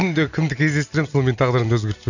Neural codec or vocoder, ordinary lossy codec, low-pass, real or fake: none; none; 7.2 kHz; real